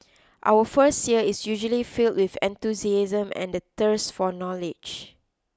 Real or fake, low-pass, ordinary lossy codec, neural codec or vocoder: real; none; none; none